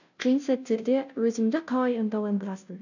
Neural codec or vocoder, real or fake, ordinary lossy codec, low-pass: codec, 16 kHz, 0.5 kbps, FunCodec, trained on Chinese and English, 25 frames a second; fake; none; 7.2 kHz